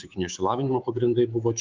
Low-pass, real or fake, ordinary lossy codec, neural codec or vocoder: 7.2 kHz; fake; Opus, 32 kbps; codec, 24 kHz, 3.1 kbps, DualCodec